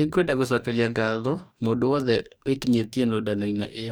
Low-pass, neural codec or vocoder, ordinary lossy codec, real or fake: none; codec, 44.1 kHz, 2.6 kbps, DAC; none; fake